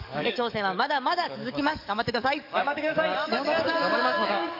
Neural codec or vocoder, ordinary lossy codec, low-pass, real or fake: codec, 44.1 kHz, 7.8 kbps, DAC; none; 5.4 kHz; fake